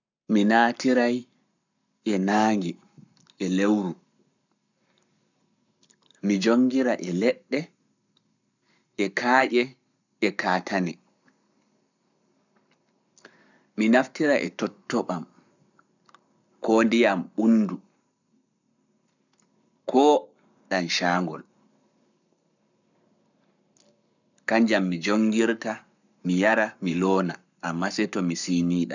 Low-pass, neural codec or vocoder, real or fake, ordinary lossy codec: 7.2 kHz; codec, 44.1 kHz, 7.8 kbps, Pupu-Codec; fake; none